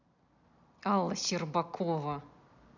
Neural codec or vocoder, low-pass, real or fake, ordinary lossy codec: none; 7.2 kHz; real; none